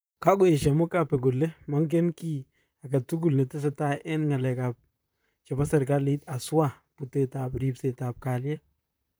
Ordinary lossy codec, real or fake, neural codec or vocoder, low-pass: none; fake; vocoder, 44.1 kHz, 128 mel bands, Pupu-Vocoder; none